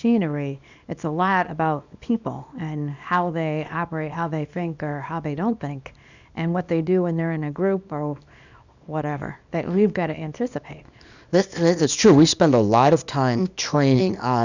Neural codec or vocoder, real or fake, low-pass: codec, 24 kHz, 0.9 kbps, WavTokenizer, small release; fake; 7.2 kHz